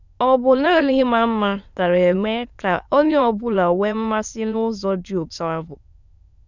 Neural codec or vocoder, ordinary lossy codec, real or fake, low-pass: autoencoder, 22.05 kHz, a latent of 192 numbers a frame, VITS, trained on many speakers; none; fake; 7.2 kHz